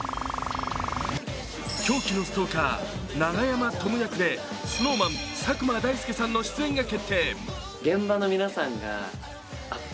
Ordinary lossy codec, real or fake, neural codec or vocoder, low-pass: none; real; none; none